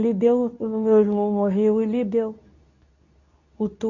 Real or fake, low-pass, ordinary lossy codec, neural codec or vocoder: fake; 7.2 kHz; none; codec, 24 kHz, 0.9 kbps, WavTokenizer, medium speech release version 2